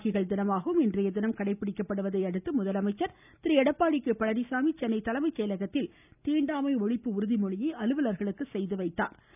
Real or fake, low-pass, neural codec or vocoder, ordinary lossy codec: real; 3.6 kHz; none; none